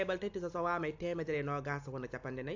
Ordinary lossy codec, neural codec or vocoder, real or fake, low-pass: AAC, 48 kbps; none; real; 7.2 kHz